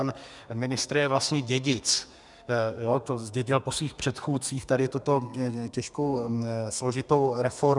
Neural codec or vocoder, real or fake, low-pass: codec, 32 kHz, 1.9 kbps, SNAC; fake; 10.8 kHz